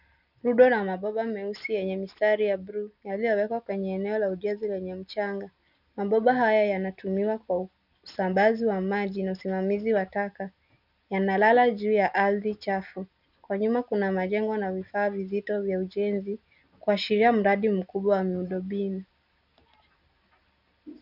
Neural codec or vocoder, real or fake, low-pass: none; real; 5.4 kHz